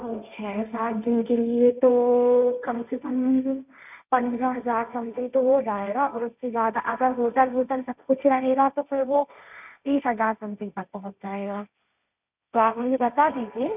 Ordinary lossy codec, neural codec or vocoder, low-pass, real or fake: none; codec, 16 kHz, 1.1 kbps, Voila-Tokenizer; 3.6 kHz; fake